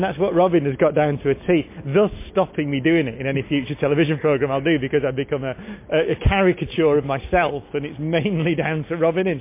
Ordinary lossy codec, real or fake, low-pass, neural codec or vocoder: MP3, 24 kbps; real; 3.6 kHz; none